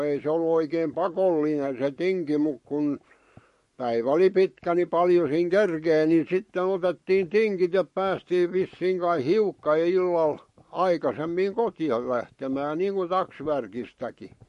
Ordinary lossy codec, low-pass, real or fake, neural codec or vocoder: MP3, 48 kbps; 14.4 kHz; fake; codec, 44.1 kHz, 7.8 kbps, Pupu-Codec